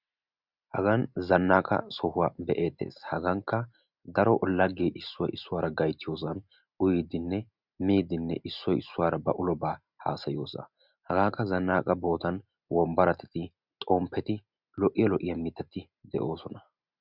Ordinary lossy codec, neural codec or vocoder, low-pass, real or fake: Opus, 64 kbps; none; 5.4 kHz; real